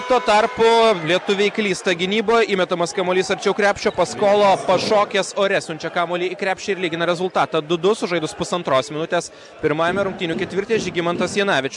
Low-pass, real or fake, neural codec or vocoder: 10.8 kHz; real; none